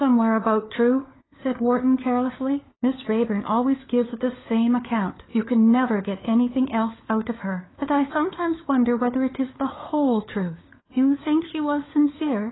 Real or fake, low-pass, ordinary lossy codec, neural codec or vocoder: fake; 7.2 kHz; AAC, 16 kbps; codec, 16 kHz, 4 kbps, FunCodec, trained on LibriTTS, 50 frames a second